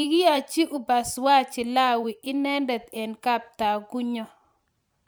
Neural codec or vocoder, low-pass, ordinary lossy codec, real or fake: vocoder, 44.1 kHz, 128 mel bands every 512 samples, BigVGAN v2; none; none; fake